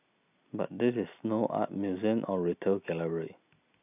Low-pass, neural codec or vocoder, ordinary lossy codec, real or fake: 3.6 kHz; none; none; real